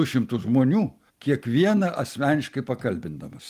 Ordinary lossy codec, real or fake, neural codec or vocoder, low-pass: Opus, 32 kbps; real; none; 14.4 kHz